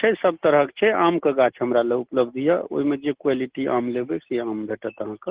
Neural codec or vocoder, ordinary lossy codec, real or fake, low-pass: none; Opus, 16 kbps; real; 3.6 kHz